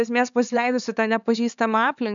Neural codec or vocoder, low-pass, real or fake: codec, 16 kHz, 4 kbps, X-Codec, HuBERT features, trained on LibriSpeech; 7.2 kHz; fake